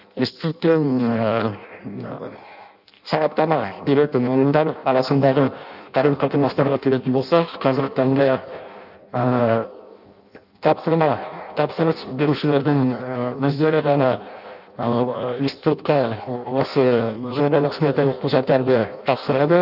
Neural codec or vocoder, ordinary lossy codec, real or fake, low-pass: codec, 16 kHz in and 24 kHz out, 0.6 kbps, FireRedTTS-2 codec; none; fake; 5.4 kHz